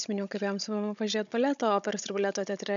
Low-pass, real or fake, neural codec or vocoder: 7.2 kHz; fake; codec, 16 kHz, 16 kbps, FunCodec, trained on Chinese and English, 50 frames a second